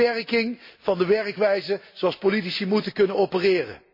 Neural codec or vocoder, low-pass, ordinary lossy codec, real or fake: none; 5.4 kHz; MP3, 24 kbps; real